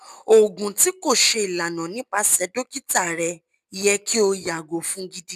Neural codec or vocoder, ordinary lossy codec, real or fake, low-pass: none; none; real; 14.4 kHz